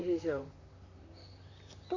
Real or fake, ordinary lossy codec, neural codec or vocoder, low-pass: fake; none; vocoder, 44.1 kHz, 128 mel bands, Pupu-Vocoder; 7.2 kHz